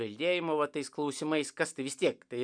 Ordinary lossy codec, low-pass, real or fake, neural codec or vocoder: MP3, 96 kbps; 9.9 kHz; real; none